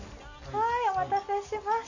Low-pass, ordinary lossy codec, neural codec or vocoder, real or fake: 7.2 kHz; none; none; real